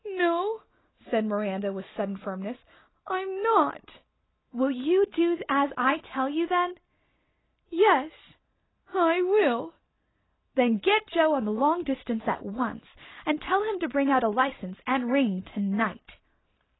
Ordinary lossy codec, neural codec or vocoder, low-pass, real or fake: AAC, 16 kbps; none; 7.2 kHz; real